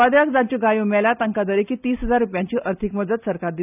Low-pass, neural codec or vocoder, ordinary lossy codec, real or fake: 3.6 kHz; none; none; real